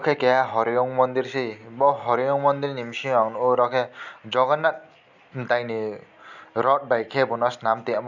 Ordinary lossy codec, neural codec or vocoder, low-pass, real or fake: none; none; 7.2 kHz; real